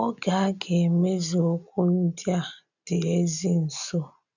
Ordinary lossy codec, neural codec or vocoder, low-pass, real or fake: none; none; 7.2 kHz; real